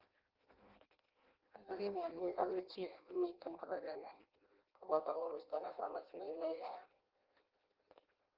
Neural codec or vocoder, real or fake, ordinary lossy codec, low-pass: codec, 16 kHz in and 24 kHz out, 0.6 kbps, FireRedTTS-2 codec; fake; Opus, 16 kbps; 5.4 kHz